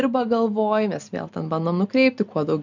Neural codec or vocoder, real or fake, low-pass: none; real; 7.2 kHz